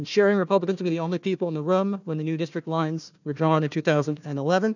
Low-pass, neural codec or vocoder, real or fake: 7.2 kHz; codec, 16 kHz, 1 kbps, FunCodec, trained on Chinese and English, 50 frames a second; fake